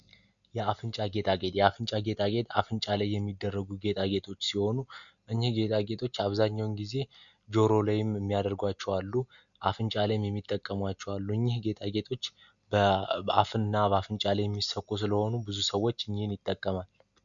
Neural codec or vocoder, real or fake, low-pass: none; real; 7.2 kHz